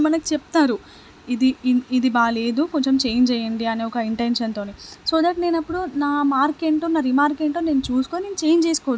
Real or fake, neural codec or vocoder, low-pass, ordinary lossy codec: real; none; none; none